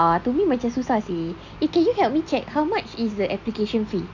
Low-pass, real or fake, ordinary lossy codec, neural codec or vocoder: 7.2 kHz; real; none; none